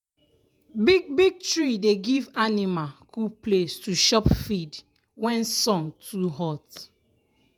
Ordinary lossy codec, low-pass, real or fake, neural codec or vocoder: none; none; fake; vocoder, 48 kHz, 128 mel bands, Vocos